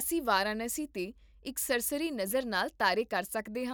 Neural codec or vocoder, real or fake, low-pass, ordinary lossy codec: none; real; none; none